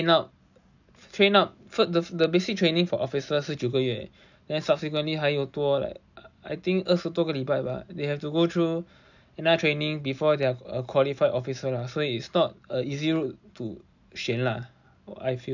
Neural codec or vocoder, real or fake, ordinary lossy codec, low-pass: none; real; none; 7.2 kHz